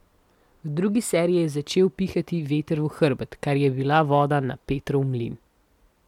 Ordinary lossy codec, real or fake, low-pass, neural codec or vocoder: MP3, 96 kbps; real; 19.8 kHz; none